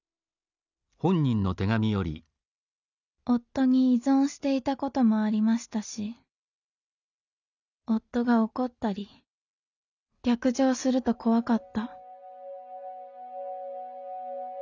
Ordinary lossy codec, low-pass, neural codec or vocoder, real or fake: none; 7.2 kHz; none; real